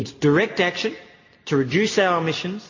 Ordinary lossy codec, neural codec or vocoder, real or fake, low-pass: MP3, 32 kbps; none; real; 7.2 kHz